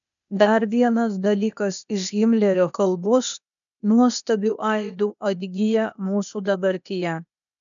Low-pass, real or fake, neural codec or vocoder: 7.2 kHz; fake; codec, 16 kHz, 0.8 kbps, ZipCodec